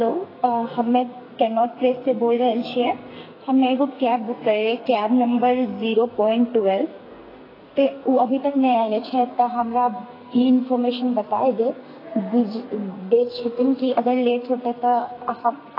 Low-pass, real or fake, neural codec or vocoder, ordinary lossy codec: 5.4 kHz; fake; codec, 44.1 kHz, 2.6 kbps, SNAC; AAC, 24 kbps